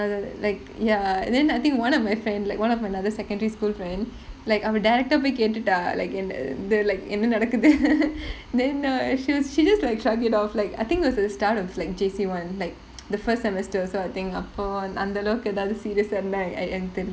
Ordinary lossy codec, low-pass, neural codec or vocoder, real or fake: none; none; none; real